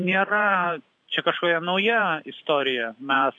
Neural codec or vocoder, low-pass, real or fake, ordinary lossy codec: vocoder, 44.1 kHz, 128 mel bands every 512 samples, BigVGAN v2; 9.9 kHz; fake; AAC, 64 kbps